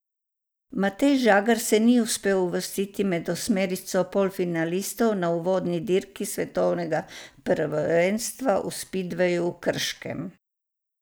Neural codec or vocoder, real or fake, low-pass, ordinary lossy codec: none; real; none; none